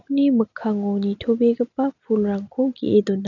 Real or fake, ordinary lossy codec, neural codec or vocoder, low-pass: real; none; none; 7.2 kHz